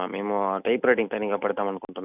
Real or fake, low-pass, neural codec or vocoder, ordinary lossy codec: real; 3.6 kHz; none; none